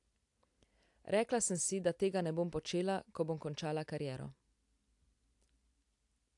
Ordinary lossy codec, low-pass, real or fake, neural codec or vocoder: AAC, 64 kbps; 10.8 kHz; real; none